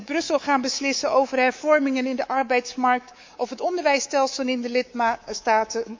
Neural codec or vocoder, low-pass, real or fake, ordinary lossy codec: codec, 24 kHz, 3.1 kbps, DualCodec; 7.2 kHz; fake; MP3, 64 kbps